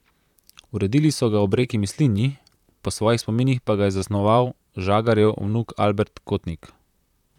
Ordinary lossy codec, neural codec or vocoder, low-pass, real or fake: none; vocoder, 44.1 kHz, 128 mel bands, Pupu-Vocoder; 19.8 kHz; fake